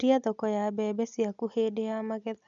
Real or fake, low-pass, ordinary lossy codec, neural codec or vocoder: real; 7.2 kHz; none; none